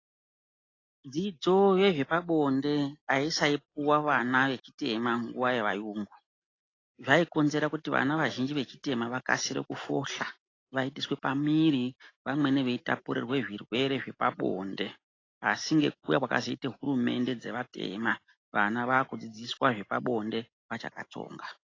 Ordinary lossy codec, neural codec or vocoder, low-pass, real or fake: AAC, 32 kbps; none; 7.2 kHz; real